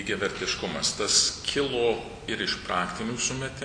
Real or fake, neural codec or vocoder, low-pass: real; none; 9.9 kHz